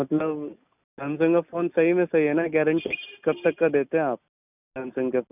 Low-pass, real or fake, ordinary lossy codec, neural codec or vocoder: 3.6 kHz; real; none; none